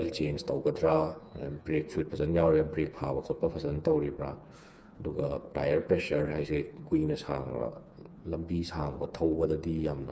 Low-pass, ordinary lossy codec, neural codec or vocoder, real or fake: none; none; codec, 16 kHz, 4 kbps, FreqCodec, smaller model; fake